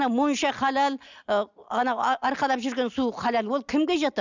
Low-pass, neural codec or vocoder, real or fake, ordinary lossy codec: 7.2 kHz; none; real; none